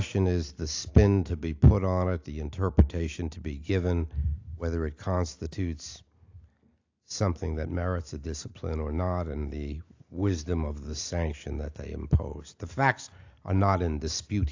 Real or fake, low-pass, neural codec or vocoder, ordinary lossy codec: real; 7.2 kHz; none; AAC, 48 kbps